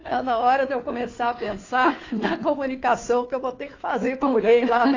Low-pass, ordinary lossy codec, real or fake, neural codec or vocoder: 7.2 kHz; AAC, 32 kbps; fake; codec, 16 kHz, 2 kbps, FunCodec, trained on Chinese and English, 25 frames a second